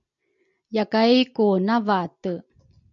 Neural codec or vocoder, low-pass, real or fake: none; 7.2 kHz; real